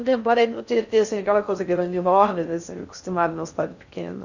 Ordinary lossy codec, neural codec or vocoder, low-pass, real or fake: none; codec, 16 kHz in and 24 kHz out, 0.6 kbps, FocalCodec, streaming, 4096 codes; 7.2 kHz; fake